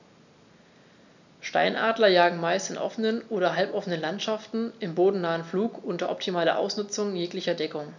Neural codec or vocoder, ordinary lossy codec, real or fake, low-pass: none; none; real; 7.2 kHz